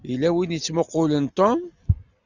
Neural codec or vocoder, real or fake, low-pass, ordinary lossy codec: none; real; 7.2 kHz; Opus, 64 kbps